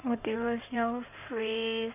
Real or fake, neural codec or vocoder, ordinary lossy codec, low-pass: fake; codec, 16 kHz in and 24 kHz out, 2.2 kbps, FireRedTTS-2 codec; none; 3.6 kHz